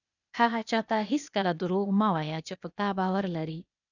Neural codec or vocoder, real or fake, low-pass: codec, 16 kHz, 0.8 kbps, ZipCodec; fake; 7.2 kHz